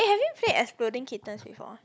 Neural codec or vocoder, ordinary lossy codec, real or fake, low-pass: none; none; real; none